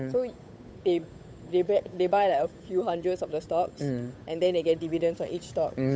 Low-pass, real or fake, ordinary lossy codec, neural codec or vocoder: none; fake; none; codec, 16 kHz, 8 kbps, FunCodec, trained on Chinese and English, 25 frames a second